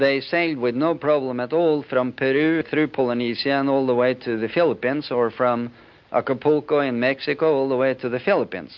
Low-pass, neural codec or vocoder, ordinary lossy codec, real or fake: 7.2 kHz; none; MP3, 48 kbps; real